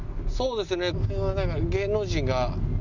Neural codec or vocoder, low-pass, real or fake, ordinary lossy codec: none; 7.2 kHz; real; none